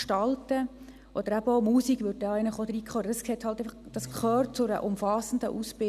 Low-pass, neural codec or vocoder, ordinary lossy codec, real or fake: 14.4 kHz; none; none; real